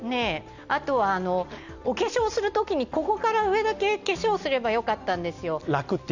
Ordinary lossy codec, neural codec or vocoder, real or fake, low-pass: none; none; real; 7.2 kHz